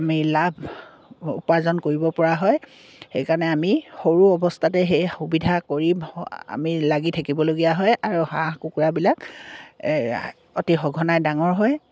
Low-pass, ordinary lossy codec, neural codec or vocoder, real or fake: none; none; none; real